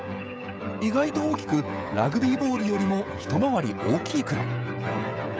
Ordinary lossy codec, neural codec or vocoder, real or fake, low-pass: none; codec, 16 kHz, 16 kbps, FreqCodec, smaller model; fake; none